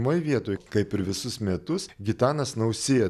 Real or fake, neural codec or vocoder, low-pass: real; none; 14.4 kHz